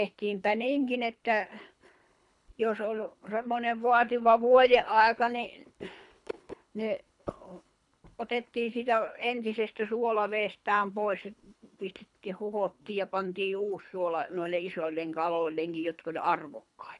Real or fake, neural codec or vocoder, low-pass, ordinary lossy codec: fake; codec, 24 kHz, 3 kbps, HILCodec; 10.8 kHz; none